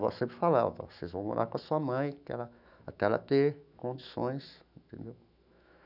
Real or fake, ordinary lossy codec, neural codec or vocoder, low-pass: fake; none; autoencoder, 48 kHz, 128 numbers a frame, DAC-VAE, trained on Japanese speech; 5.4 kHz